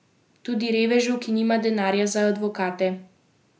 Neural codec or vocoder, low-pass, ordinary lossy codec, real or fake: none; none; none; real